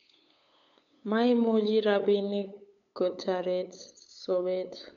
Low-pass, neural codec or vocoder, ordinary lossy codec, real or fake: 7.2 kHz; codec, 16 kHz, 8 kbps, FunCodec, trained on LibriTTS, 25 frames a second; none; fake